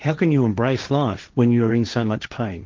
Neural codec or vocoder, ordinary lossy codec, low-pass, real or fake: codec, 16 kHz, 1.1 kbps, Voila-Tokenizer; Opus, 32 kbps; 7.2 kHz; fake